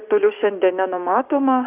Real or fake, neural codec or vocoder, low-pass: fake; codec, 16 kHz, 6 kbps, DAC; 3.6 kHz